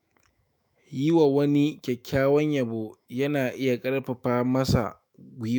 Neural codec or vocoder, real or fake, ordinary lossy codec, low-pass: autoencoder, 48 kHz, 128 numbers a frame, DAC-VAE, trained on Japanese speech; fake; none; none